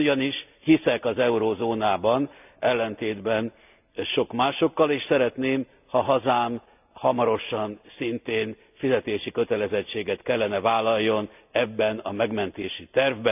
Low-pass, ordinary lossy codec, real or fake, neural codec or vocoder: 3.6 kHz; none; real; none